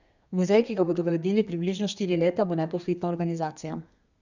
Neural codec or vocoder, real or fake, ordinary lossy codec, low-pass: codec, 32 kHz, 1.9 kbps, SNAC; fake; none; 7.2 kHz